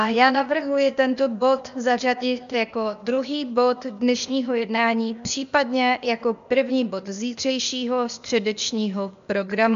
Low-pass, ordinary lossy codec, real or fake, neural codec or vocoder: 7.2 kHz; AAC, 96 kbps; fake; codec, 16 kHz, 0.8 kbps, ZipCodec